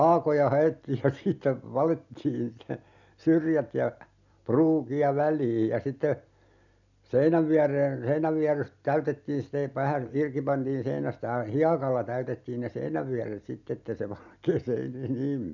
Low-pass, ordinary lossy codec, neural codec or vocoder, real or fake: 7.2 kHz; none; none; real